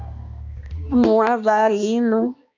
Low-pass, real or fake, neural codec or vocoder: 7.2 kHz; fake; codec, 16 kHz, 1 kbps, X-Codec, HuBERT features, trained on balanced general audio